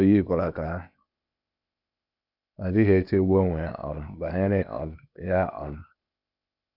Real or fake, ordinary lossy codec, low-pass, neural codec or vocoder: fake; none; 5.4 kHz; codec, 16 kHz, 0.8 kbps, ZipCodec